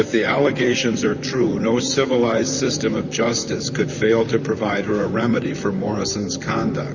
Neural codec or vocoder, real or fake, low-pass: vocoder, 44.1 kHz, 128 mel bands, Pupu-Vocoder; fake; 7.2 kHz